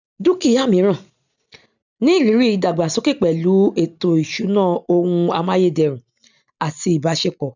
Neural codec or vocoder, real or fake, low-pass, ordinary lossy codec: none; real; 7.2 kHz; none